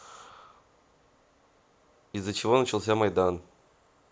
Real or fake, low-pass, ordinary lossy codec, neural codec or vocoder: real; none; none; none